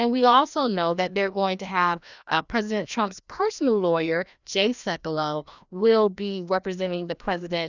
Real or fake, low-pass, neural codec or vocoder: fake; 7.2 kHz; codec, 16 kHz, 1 kbps, FreqCodec, larger model